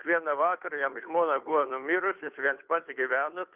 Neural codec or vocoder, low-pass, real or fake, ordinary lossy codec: codec, 16 kHz, 2 kbps, FunCodec, trained on Chinese and English, 25 frames a second; 3.6 kHz; fake; Opus, 24 kbps